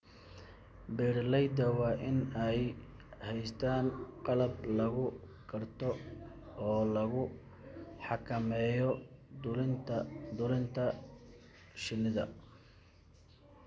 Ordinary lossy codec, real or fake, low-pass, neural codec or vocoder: none; real; none; none